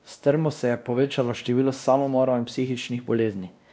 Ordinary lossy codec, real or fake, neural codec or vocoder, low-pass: none; fake; codec, 16 kHz, 1 kbps, X-Codec, WavLM features, trained on Multilingual LibriSpeech; none